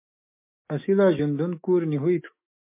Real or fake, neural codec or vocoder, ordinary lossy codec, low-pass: fake; autoencoder, 48 kHz, 128 numbers a frame, DAC-VAE, trained on Japanese speech; MP3, 24 kbps; 3.6 kHz